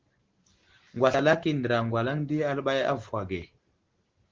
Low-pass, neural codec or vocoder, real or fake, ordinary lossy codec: 7.2 kHz; none; real; Opus, 16 kbps